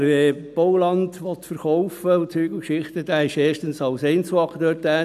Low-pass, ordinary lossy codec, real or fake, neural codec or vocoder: 14.4 kHz; none; real; none